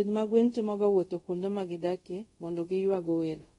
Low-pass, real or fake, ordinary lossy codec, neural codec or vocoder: 10.8 kHz; fake; AAC, 32 kbps; codec, 24 kHz, 0.5 kbps, DualCodec